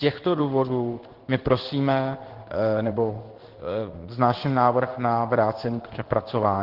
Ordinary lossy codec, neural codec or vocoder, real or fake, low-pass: Opus, 16 kbps; codec, 16 kHz in and 24 kHz out, 1 kbps, XY-Tokenizer; fake; 5.4 kHz